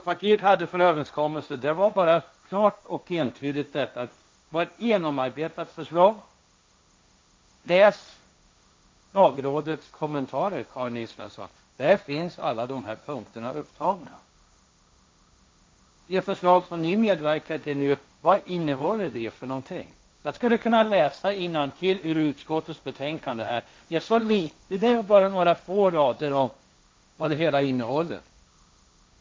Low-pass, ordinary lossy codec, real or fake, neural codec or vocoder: none; none; fake; codec, 16 kHz, 1.1 kbps, Voila-Tokenizer